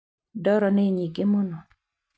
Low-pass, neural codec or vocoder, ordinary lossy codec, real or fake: none; none; none; real